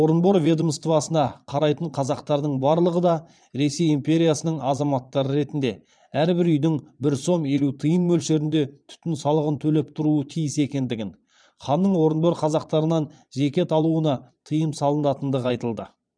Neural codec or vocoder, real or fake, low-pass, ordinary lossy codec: vocoder, 22.05 kHz, 80 mel bands, Vocos; fake; 9.9 kHz; none